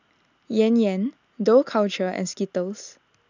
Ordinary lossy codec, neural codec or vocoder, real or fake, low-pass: none; none; real; 7.2 kHz